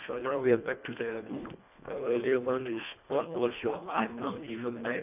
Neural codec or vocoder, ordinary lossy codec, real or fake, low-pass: codec, 24 kHz, 1.5 kbps, HILCodec; none; fake; 3.6 kHz